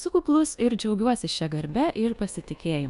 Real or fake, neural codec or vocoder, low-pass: fake; codec, 24 kHz, 1.2 kbps, DualCodec; 10.8 kHz